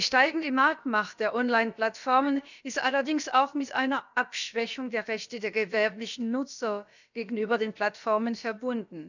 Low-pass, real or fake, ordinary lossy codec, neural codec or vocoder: 7.2 kHz; fake; none; codec, 16 kHz, about 1 kbps, DyCAST, with the encoder's durations